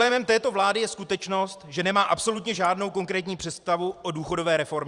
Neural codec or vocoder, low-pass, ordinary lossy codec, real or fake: vocoder, 44.1 kHz, 128 mel bands every 512 samples, BigVGAN v2; 10.8 kHz; Opus, 64 kbps; fake